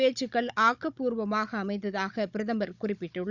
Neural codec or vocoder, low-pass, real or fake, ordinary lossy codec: codec, 16 kHz, 16 kbps, FunCodec, trained on Chinese and English, 50 frames a second; 7.2 kHz; fake; none